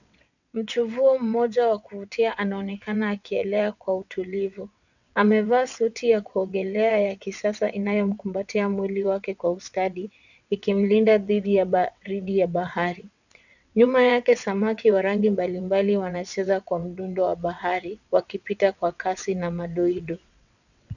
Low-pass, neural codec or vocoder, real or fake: 7.2 kHz; vocoder, 22.05 kHz, 80 mel bands, WaveNeXt; fake